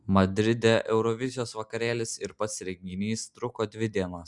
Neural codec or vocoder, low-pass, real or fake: none; 10.8 kHz; real